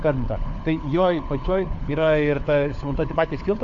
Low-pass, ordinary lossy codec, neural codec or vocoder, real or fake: 7.2 kHz; AAC, 64 kbps; codec, 16 kHz, 4 kbps, FunCodec, trained on LibriTTS, 50 frames a second; fake